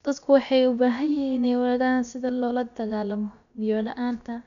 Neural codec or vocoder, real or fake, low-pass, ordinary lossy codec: codec, 16 kHz, about 1 kbps, DyCAST, with the encoder's durations; fake; 7.2 kHz; none